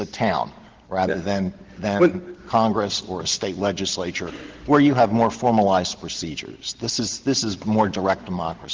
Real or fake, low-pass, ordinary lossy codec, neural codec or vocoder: real; 7.2 kHz; Opus, 16 kbps; none